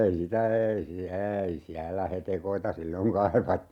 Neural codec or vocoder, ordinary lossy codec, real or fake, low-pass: none; none; real; 19.8 kHz